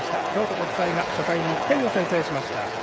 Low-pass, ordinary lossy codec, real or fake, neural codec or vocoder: none; none; fake; codec, 16 kHz, 16 kbps, FreqCodec, smaller model